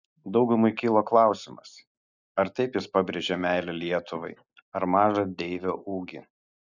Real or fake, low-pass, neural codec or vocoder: real; 7.2 kHz; none